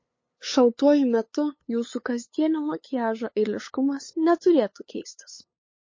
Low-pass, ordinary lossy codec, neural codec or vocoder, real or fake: 7.2 kHz; MP3, 32 kbps; codec, 16 kHz, 8 kbps, FunCodec, trained on LibriTTS, 25 frames a second; fake